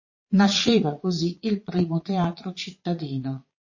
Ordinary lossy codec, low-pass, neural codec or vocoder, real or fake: MP3, 32 kbps; 7.2 kHz; vocoder, 22.05 kHz, 80 mel bands, Vocos; fake